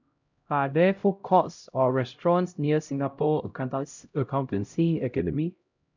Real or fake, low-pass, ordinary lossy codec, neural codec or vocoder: fake; 7.2 kHz; none; codec, 16 kHz, 0.5 kbps, X-Codec, HuBERT features, trained on LibriSpeech